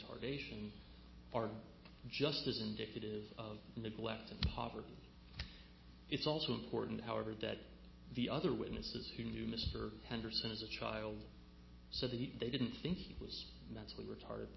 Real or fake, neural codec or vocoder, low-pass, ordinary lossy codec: real; none; 7.2 kHz; MP3, 24 kbps